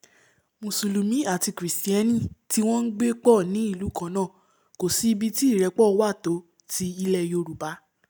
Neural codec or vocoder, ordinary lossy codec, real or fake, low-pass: none; none; real; none